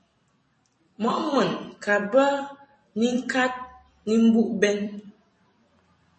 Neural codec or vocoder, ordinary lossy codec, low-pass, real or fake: vocoder, 44.1 kHz, 128 mel bands every 256 samples, BigVGAN v2; MP3, 32 kbps; 10.8 kHz; fake